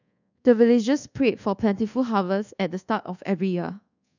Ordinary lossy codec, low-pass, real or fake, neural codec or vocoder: none; 7.2 kHz; fake; codec, 24 kHz, 1.2 kbps, DualCodec